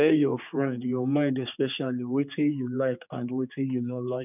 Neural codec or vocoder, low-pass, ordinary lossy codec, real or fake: codec, 16 kHz, 4 kbps, X-Codec, HuBERT features, trained on general audio; 3.6 kHz; none; fake